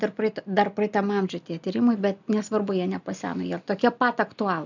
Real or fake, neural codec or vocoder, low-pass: real; none; 7.2 kHz